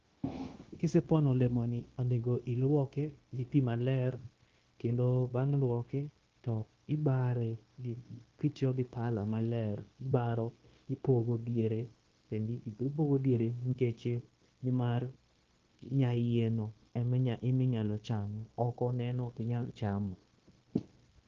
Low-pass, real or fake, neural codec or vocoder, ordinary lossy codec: 7.2 kHz; fake; codec, 16 kHz, 0.9 kbps, LongCat-Audio-Codec; Opus, 16 kbps